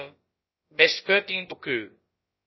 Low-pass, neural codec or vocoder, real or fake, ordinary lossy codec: 7.2 kHz; codec, 16 kHz, about 1 kbps, DyCAST, with the encoder's durations; fake; MP3, 24 kbps